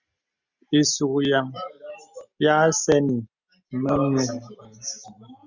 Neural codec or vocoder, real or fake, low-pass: none; real; 7.2 kHz